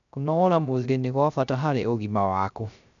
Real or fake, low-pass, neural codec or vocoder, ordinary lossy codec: fake; 7.2 kHz; codec, 16 kHz, 0.3 kbps, FocalCodec; none